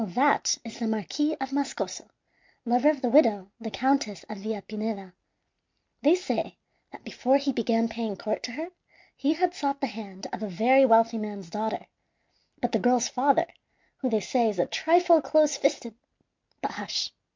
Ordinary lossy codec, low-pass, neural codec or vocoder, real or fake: MP3, 64 kbps; 7.2 kHz; none; real